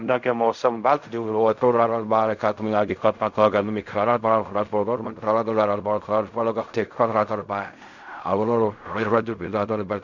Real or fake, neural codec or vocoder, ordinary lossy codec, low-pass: fake; codec, 16 kHz in and 24 kHz out, 0.4 kbps, LongCat-Audio-Codec, fine tuned four codebook decoder; none; 7.2 kHz